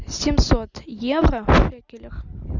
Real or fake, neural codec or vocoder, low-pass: real; none; 7.2 kHz